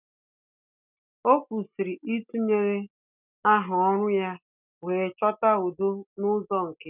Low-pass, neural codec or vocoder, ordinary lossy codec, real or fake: 3.6 kHz; none; none; real